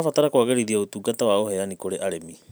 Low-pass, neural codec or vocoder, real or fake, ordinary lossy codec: none; vocoder, 44.1 kHz, 128 mel bands every 512 samples, BigVGAN v2; fake; none